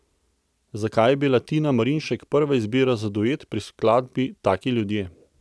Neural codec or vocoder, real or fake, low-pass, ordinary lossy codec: none; real; none; none